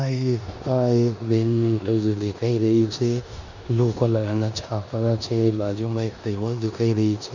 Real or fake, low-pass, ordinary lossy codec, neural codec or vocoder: fake; 7.2 kHz; none; codec, 16 kHz in and 24 kHz out, 0.9 kbps, LongCat-Audio-Codec, four codebook decoder